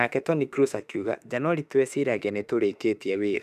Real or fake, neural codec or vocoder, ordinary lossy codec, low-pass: fake; autoencoder, 48 kHz, 32 numbers a frame, DAC-VAE, trained on Japanese speech; none; 14.4 kHz